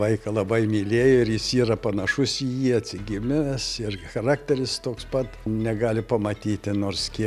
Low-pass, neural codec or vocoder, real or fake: 14.4 kHz; none; real